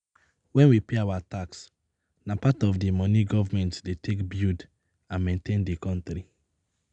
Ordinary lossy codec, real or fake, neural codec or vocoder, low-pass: none; real; none; 9.9 kHz